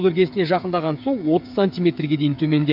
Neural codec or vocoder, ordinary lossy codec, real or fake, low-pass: codec, 16 kHz, 8 kbps, FreqCodec, smaller model; AAC, 48 kbps; fake; 5.4 kHz